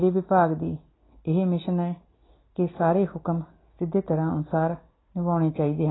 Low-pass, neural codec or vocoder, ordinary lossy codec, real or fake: 7.2 kHz; none; AAC, 16 kbps; real